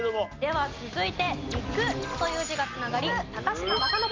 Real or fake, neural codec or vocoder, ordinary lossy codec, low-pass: real; none; Opus, 32 kbps; 7.2 kHz